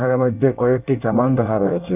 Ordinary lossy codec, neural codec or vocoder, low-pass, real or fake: none; codec, 24 kHz, 1 kbps, SNAC; 3.6 kHz; fake